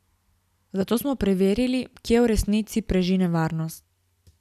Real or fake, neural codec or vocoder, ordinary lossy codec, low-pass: real; none; none; 14.4 kHz